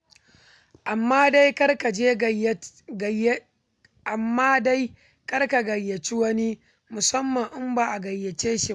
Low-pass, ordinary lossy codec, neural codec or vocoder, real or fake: none; none; none; real